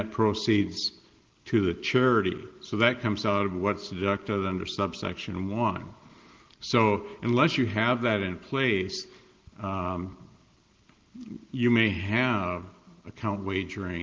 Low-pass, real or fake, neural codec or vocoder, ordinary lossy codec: 7.2 kHz; real; none; Opus, 16 kbps